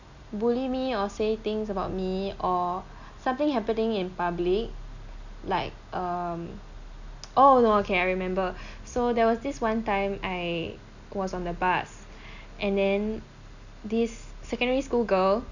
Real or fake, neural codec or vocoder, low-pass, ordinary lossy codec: real; none; 7.2 kHz; none